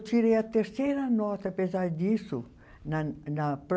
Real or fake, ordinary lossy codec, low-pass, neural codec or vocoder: real; none; none; none